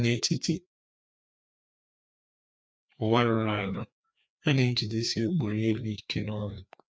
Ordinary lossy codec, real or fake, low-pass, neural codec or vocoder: none; fake; none; codec, 16 kHz, 2 kbps, FreqCodec, larger model